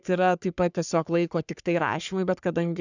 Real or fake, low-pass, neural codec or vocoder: fake; 7.2 kHz; codec, 44.1 kHz, 3.4 kbps, Pupu-Codec